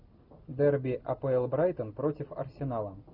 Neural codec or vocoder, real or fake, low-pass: none; real; 5.4 kHz